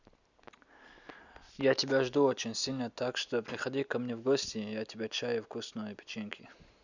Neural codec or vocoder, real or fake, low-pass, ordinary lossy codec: none; real; 7.2 kHz; none